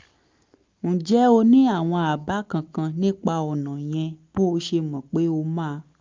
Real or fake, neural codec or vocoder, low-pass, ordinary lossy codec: real; none; 7.2 kHz; Opus, 32 kbps